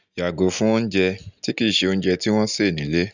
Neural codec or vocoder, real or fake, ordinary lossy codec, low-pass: none; real; none; 7.2 kHz